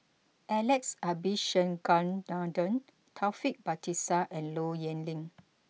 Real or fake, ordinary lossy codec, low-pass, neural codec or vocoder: real; none; none; none